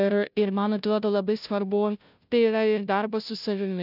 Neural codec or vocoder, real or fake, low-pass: codec, 16 kHz, 0.5 kbps, FunCodec, trained on Chinese and English, 25 frames a second; fake; 5.4 kHz